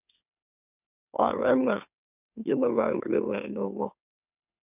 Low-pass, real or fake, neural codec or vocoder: 3.6 kHz; fake; autoencoder, 44.1 kHz, a latent of 192 numbers a frame, MeloTTS